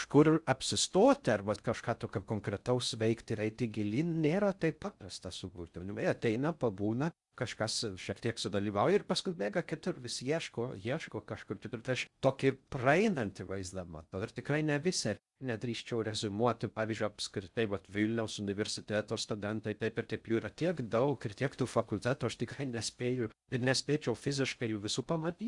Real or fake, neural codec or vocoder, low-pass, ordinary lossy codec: fake; codec, 16 kHz in and 24 kHz out, 0.6 kbps, FocalCodec, streaming, 4096 codes; 10.8 kHz; Opus, 64 kbps